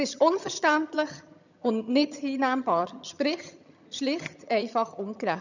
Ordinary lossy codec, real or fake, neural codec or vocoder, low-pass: none; fake; vocoder, 22.05 kHz, 80 mel bands, HiFi-GAN; 7.2 kHz